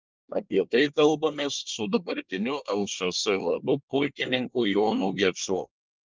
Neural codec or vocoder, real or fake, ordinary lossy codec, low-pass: codec, 24 kHz, 1 kbps, SNAC; fake; Opus, 24 kbps; 7.2 kHz